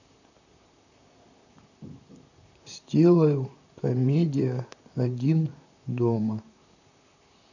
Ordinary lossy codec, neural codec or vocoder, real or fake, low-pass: none; vocoder, 44.1 kHz, 128 mel bands, Pupu-Vocoder; fake; 7.2 kHz